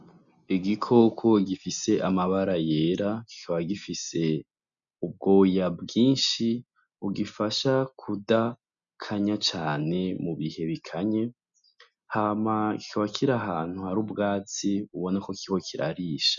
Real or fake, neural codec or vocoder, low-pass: real; none; 7.2 kHz